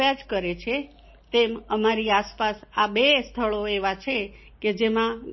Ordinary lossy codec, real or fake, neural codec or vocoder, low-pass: MP3, 24 kbps; real; none; 7.2 kHz